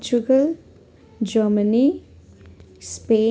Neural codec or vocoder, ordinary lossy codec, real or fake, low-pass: none; none; real; none